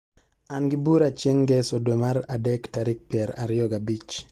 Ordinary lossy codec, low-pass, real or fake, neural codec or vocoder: Opus, 24 kbps; 14.4 kHz; real; none